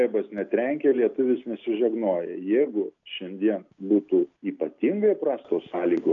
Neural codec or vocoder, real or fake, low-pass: none; real; 7.2 kHz